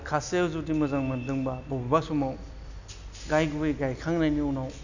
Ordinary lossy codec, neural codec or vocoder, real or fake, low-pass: none; none; real; 7.2 kHz